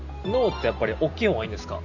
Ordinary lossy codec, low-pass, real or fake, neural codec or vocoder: none; 7.2 kHz; real; none